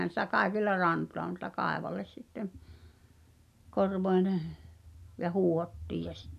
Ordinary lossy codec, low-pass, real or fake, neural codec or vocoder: none; 14.4 kHz; real; none